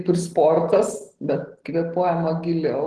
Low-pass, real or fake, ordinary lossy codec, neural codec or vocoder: 10.8 kHz; real; Opus, 16 kbps; none